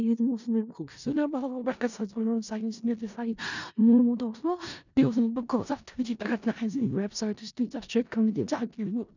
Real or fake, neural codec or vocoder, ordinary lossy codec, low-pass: fake; codec, 16 kHz in and 24 kHz out, 0.4 kbps, LongCat-Audio-Codec, four codebook decoder; none; 7.2 kHz